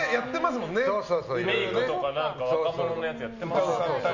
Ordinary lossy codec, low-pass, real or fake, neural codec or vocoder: none; 7.2 kHz; real; none